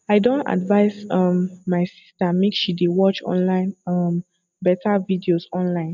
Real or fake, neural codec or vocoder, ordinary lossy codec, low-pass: real; none; none; 7.2 kHz